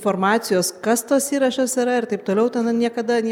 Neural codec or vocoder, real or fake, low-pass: none; real; 19.8 kHz